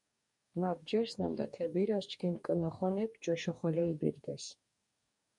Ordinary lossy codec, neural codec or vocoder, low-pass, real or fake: MP3, 96 kbps; codec, 44.1 kHz, 2.6 kbps, DAC; 10.8 kHz; fake